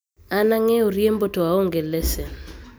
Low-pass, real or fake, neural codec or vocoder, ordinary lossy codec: none; real; none; none